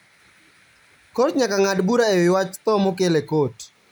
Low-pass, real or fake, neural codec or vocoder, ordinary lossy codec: none; real; none; none